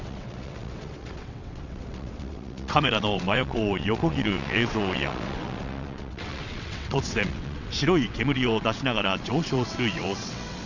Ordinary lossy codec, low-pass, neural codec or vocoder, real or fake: none; 7.2 kHz; vocoder, 22.05 kHz, 80 mel bands, WaveNeXt; fake